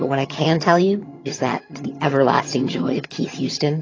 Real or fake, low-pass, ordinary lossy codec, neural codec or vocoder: fake; 7.2 kHz; AAC, 32 kbps; vocoder, 22.05 kHz, 80 mel bands, HiFi-GAN